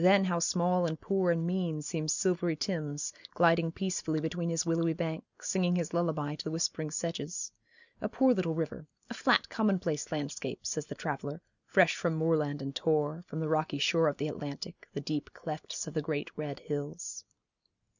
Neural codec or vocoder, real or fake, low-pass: none; real; 7.2 kHz